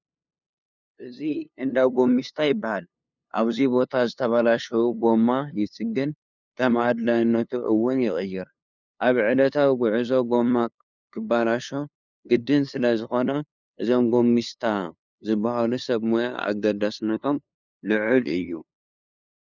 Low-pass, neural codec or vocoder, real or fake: 7.2 kHz; codec, 16 kHz, 2 kbps, FunCodec, trained on LibriTTS, 25 frames a second; fake